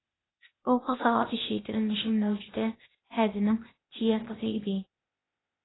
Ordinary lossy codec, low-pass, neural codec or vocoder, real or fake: AAC, 16 kbps; 7.2 kHz; codec, 16 kHz, 0.8 kbps, ZipCodec; fake